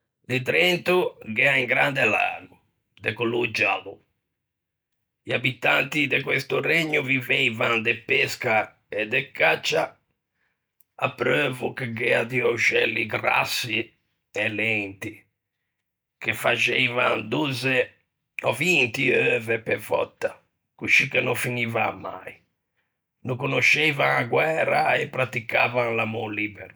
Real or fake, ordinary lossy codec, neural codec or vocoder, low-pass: real; none; none; none